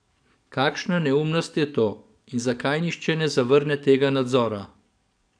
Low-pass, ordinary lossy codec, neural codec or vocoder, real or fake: 9.9 kHz; none; codec, 44.1 kHz, 7.8 kbps, DAC; fake